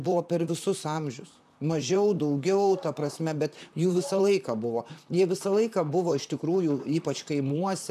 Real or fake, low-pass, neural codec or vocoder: fake; 14.4 kHz; vocoder, 44.1 kHz, 128 mel bands, Pupu-Vocoder